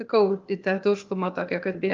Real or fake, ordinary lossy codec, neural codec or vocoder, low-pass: fake; Opus, 32 kbps; codec, 16 kHz, 0.8 kbps, ZipCodec; 7.2 kHz